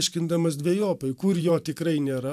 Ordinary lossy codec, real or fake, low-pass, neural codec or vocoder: AAC, 96 kbps; fake; 14.4 kHz; vocoder, 44.1 kHz, 128 mel bands every 512 samples, BigVGAN v2